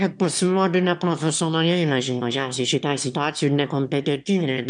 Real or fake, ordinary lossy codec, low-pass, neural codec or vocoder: fake; MP3, 96 kbps; 9.9 kHz; autoencoder, 22.05 kHz, a latent of 192 numbers a frame, VITS, trained on one speaker